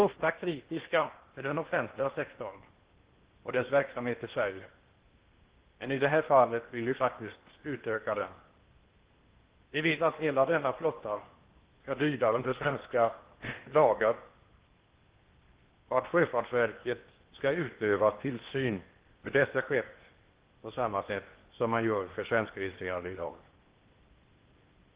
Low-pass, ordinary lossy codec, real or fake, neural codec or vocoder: 3.6 kHz; Opus, 16 kbps; fake; codec, 16 kHz in and 24 kHz out, 0.8 kbps, FocalCodec, streaming, 65536 codes